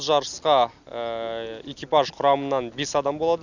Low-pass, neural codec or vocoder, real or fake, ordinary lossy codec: 7.2 kHz; none; real; none